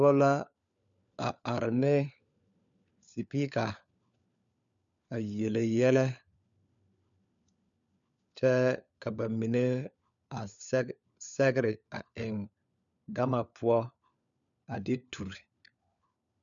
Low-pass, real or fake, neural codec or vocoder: 7.2 kHz; fake; codec, 16 kHz, 4 kbps, FunCodec, trained on LibriTTS, 50 frames a second